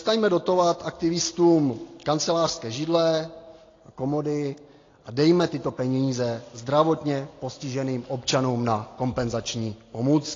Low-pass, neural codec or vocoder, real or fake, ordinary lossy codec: 7.2 kHz; none; real; AAC, 32 kbps